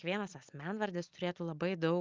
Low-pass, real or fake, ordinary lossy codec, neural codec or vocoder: 7.2 kHz; real; Opus, 24 kbps; none